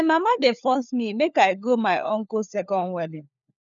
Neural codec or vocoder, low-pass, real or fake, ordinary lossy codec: codec, 16 kHz, 4 kbps, FunCodec, trained on LibriTTS, 50 frames a second; 7.2 kHz; fake; none